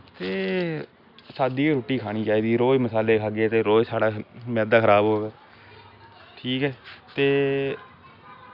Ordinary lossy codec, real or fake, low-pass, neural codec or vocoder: none; real; 5.4 kHz; none